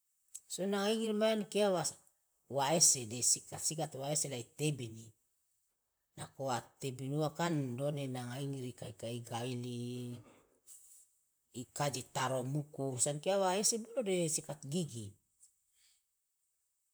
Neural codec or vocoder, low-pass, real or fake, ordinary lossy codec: vocoder, 44.1 kHz, 128 mel bands, Pupu-Vocoder; none; fake; none